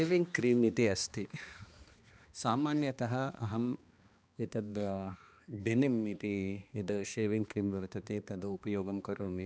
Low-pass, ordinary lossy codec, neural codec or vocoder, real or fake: none; none; codec, 16 kHz, 2 kbps, X-Codec, HuBERT features, trained on balanced general audio; fake